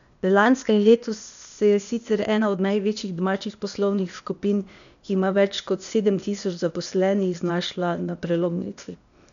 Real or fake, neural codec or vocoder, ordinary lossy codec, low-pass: fake; codec, 16 kHz, 0.8 kbps, ZipCodec; none; 7.2 kHz